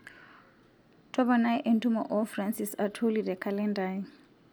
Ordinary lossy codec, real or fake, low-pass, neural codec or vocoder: none; real; none; none